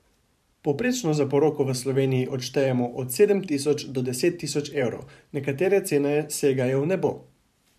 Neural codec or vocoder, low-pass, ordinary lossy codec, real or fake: vocoder, 44.1 kHz, 128 mel bands every 512 samples, BigVGAN v2; 14.4 kHz; none; fake